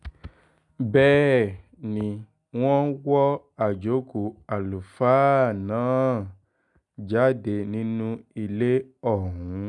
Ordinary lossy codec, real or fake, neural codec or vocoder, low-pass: none; real; none; 10.8 kHz